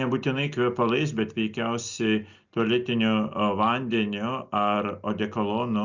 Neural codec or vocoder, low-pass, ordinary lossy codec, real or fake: none; 7.2 kHz; Opus, 64 kbps; real